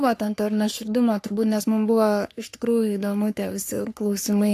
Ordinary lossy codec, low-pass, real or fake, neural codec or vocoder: AAC, 64 kbps; 14.4 kHz; fake; codec, 44.1 kHz, 3.4 kbps, Pupu-Codec